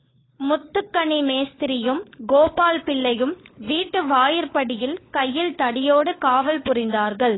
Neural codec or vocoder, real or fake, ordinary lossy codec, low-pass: codec, 16 kHz, 4 kbps, FunCodec, trained on LibriTTS, 50 frames a second; fake; AAC, 16 kbps; 7.2 kHz